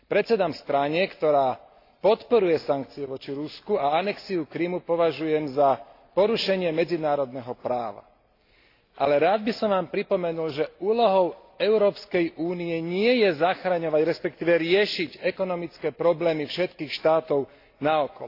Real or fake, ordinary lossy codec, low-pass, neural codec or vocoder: real; AAC, 32 kbps; 5.4 kHz; none